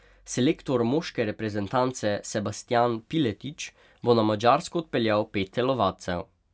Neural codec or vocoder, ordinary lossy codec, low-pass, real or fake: none; none; none; real